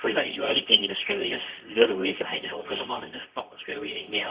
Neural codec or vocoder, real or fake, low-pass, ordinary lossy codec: codec, 24 kHz, 0.9 kbps, WavTokenizer, medium music audio release; fake; 3.6 kHz; Opus, 64 kbps